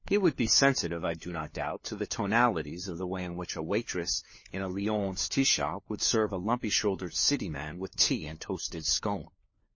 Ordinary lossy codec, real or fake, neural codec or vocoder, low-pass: MP3, 32 kbps; fake; codec, 16 kHz, 4 kbps, FunCodec, trained on LibriTTS, 50 frames a second; 7.2 kHz